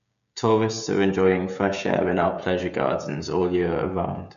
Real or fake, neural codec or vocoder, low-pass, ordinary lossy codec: fake; codec, 16 kHz, 16 kbps, FreqCodec, smaller model; 7.2 kHz; none